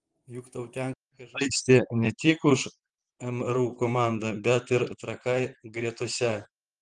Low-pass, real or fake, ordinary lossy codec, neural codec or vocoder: 10.8 kHz; fake; Opus, 24 kbps; vocoder, 44.1 kHz, 128 mel bands, Pupu-Vocoder